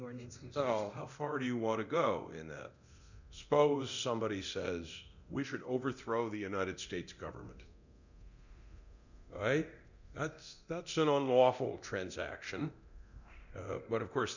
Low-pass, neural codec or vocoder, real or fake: 7.2 kHz; codec, 24 kHz, 0.9 kbps, DualCodec; fake